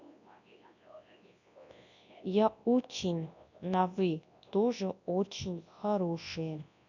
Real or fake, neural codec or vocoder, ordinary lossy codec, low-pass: fake; codec, 24 kHz, 0.9 kbps, WavTokenizer, large speech release; none; 7.2 kHz